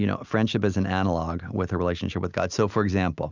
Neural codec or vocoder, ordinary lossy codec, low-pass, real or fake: none; Opus, 64 kbps; 7.2 kHz; real